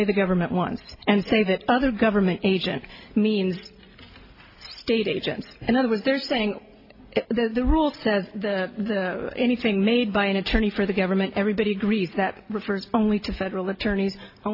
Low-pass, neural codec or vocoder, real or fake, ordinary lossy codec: 5.4 kHz; none; real; AAC, 32 kbps